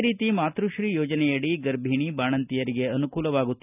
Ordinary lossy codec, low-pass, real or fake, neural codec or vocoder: none; 3.6 kHz; real; none